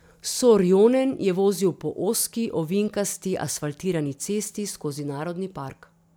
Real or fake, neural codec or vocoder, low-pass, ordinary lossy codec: real; none; none; none